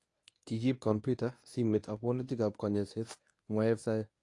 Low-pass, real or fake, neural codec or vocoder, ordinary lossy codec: 10.8 kHz; fake; codec, 24 kHz, 0.9 kbps, WavTokenizer, medium speech release version 1; AAC, 48 kbps